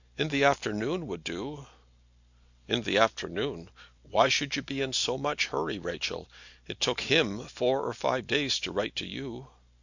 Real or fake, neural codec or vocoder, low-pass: real; none; 7.2 kHz